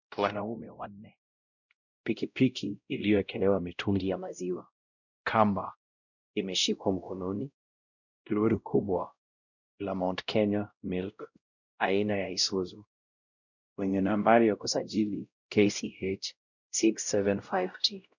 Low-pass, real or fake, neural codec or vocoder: 7.2 kHz; fake; codec, 16 kHz, 0.5 kbps, X-Codec, WavLM features, trained on Multilingual LibriSpeech